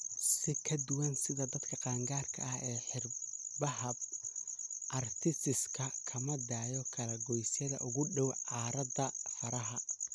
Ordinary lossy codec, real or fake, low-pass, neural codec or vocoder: none; real; none; none